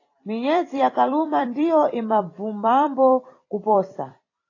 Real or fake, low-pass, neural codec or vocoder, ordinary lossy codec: fake; 7.2 kHz; vocoder, 44.1 kHz, 128 mel bands every 256 samples, BigVGAN v2; AAC, 32 kbps